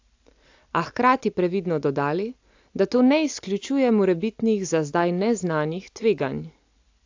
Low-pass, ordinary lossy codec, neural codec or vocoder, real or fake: 7.2 kHz; AAC, 48 kbps; none; real